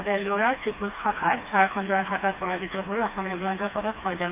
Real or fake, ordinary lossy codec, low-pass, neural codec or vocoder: fake; AAC, 24 kbps; 3.6 kHz; codec, 16 kHz, 2 kbps, FreqCodec, smaller model